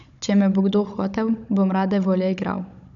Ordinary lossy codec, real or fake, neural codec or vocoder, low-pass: none; fake; codec, 16 kHz, 16 kbps, FunCodec, trained on Chinese and English, 50 frames a second; 7.2 kHz